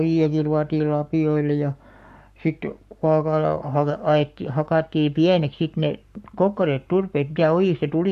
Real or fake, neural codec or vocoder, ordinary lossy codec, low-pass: fake; codec, 44.1 kHz, 7.8 kbps, Pupu-Codec; none; 14.4 kHz